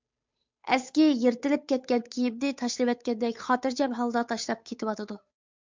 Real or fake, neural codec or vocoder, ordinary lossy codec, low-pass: fake; codec, 16 kHz, 8 kbps, FunCodec, trained on Chinese and English, 25 frames a second; MP3, 64 kbps; 7.2 kHz